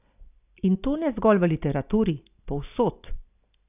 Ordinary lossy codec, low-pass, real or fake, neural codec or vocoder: none; 3.6 kHz; real; none